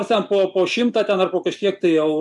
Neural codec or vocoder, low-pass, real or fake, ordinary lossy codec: none; 10.8 kHz; real; MP3, 64 kbps